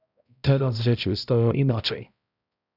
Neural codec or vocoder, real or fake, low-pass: codec, 16 kHz, 0.5 kbps, X-Codec, HuBERT features, trained on balanced general audio; fake; 5.4 kHz